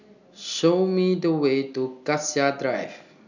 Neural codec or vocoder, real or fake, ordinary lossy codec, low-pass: none; real; none; 7.2 kHz